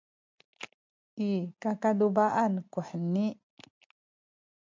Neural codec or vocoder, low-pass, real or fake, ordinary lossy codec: none; 7.2 kHz; real; MP3, 64 kbps